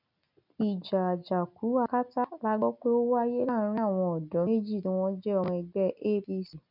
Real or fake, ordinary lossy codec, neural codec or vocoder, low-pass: real; none; none; 5.4 kHz